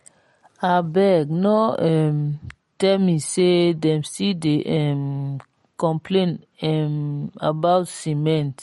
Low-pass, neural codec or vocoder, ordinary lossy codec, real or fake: 19.8 kHz; none; MP3, 48 kbps; real